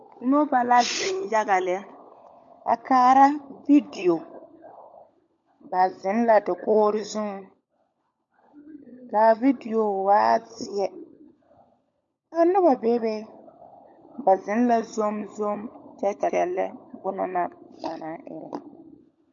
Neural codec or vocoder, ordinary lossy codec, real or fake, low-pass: codec, 16 kHz, 16 kbps, FunCodec, trained on LibriTTS, 50 frames a second; MP3, 48 kbps; fake; 7.2 kHz